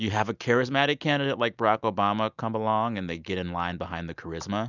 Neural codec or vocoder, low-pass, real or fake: none; 7.2 kHz; real